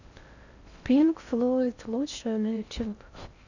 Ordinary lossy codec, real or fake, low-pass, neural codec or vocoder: none; fake; 7.2 kHz; codec, 16 kHz in and 24 kHz out, 0.6 kbps, FocalCodec, streaming, 4096 codes